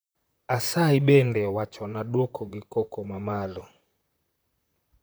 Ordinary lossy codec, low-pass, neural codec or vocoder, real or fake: none; none; vocoder, 44.1 kHz, 128 mel bands, Pupu-Vocoder; fake